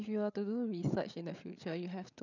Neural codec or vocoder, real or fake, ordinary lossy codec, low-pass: codec, 16 kHz, 8 kbps, FreqCodec, larger model; fake; none; 7.2 kHz